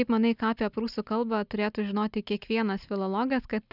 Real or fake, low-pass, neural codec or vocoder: real; 5.4 kHz; none